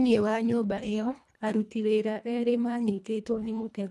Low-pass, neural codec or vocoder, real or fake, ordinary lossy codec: none; codec, 24 kHz, 1.5 kbps, HILCodec; fake; none